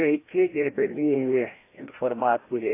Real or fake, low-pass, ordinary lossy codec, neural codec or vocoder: fake; 3.6 kHz; AAC, 24 kbps; codec, 16 kHz, 1 kbps, FreqCodec, larger model